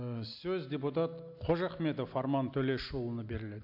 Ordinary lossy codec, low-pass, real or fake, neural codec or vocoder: AAC, 48 kbps; 5.4 kHz; real; none